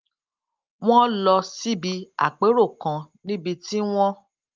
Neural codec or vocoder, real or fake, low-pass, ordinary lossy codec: none; real; 7.2 kHz; Opus, 32 kbps